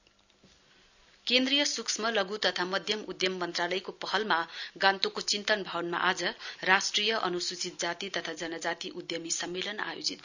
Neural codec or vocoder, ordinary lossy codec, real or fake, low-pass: none; none; real; 7.2 kHz